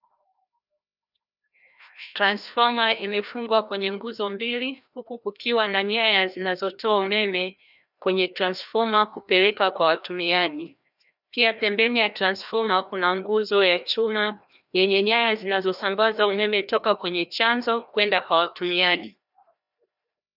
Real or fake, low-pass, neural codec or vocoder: fake; 5.4 kHz; codec, 16 kHz, 1 kbps, FreqCodec, larger model